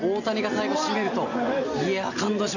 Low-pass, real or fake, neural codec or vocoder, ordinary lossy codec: 7.2 kHz; real; none; none